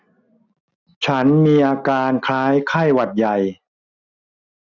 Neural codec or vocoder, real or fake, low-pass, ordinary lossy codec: none; real; 7.2 kHz; none